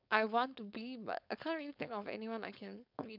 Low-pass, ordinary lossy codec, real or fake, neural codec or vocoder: 5.4 kHz; none; fake; codec, 16 kHz, 4.8 kbps, FACodec